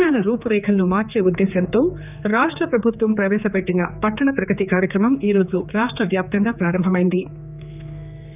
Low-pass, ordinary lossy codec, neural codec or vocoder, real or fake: 3.6 kHz; none; codec, 16 kHz, 4 kbps, X-Codec, HuBERT features, trained on general audio; fake